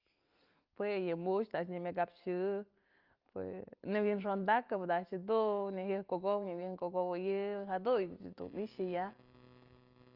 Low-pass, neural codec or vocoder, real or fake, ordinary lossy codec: 5.4 kHz; none; real; Opus, 24 kbps